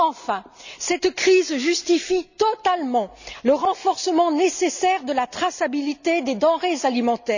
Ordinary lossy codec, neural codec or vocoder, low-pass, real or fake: none; none; 7.2 kHz; real